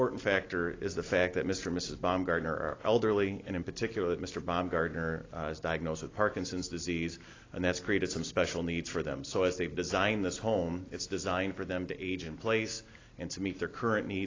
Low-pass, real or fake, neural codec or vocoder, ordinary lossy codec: 7.2 kHz; real; none; AAC, 32 kbps